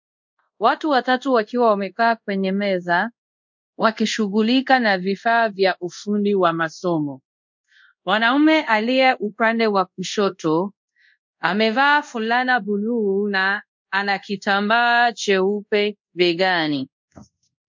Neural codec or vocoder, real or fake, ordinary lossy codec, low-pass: codec, 24 kHz, 0.5 kbps, DualCodec; fake; MP3, 64 kbps; 7.2 kHz